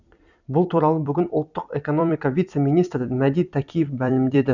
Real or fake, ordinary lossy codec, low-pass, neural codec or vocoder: fake; none; 7.2 kHz; vocoder, 44.1 kHz, 128 mel bands every 256 samples, BigVGAN v2